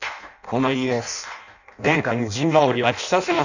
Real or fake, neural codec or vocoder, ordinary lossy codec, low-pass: fake; codec, 16 kHz in and 24 kHz out, 0.6 kbps, FireRedTTS-2 codec; none; 7.2 kHz